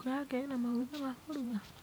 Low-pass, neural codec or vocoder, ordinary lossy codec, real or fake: none; vocoder, 44.1 kHz, 128 mel bands every 512 samples, BigVGAN v2; none; fake